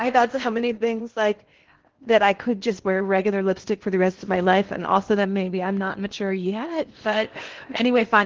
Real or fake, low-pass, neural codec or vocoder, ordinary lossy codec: fake; 7.2 kHz; codec, 16 kHz in and 24 kHz out, 0.8 kbps, FocalCodec, streaming, 65536 codes; Opus, 16 kbps